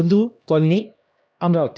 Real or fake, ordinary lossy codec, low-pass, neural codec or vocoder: fake; none; none; codec, 16 kHz, 1 kbps, X-Codec, HuBERT features, trained on LibriSpeech